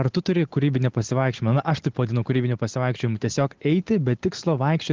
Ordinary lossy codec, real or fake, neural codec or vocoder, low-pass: Opus, 16 kbps; real; none; 7.2 kHz